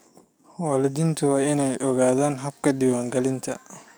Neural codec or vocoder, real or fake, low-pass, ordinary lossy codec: codec, 44.1 kHz, 7.8 kbps, DAC; fake; none; none